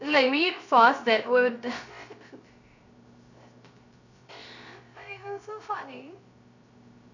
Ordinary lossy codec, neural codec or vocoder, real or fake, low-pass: none; codec, 16 kHz, 0.3 kbps, FocalCodec; fake; 7.2 kHz